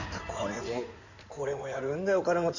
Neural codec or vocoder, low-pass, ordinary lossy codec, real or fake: codec, 16 kHz in and 24 kHz out, 2.2 kbps, FireRedTTS-2 codec; 7.2 kHz; none; fake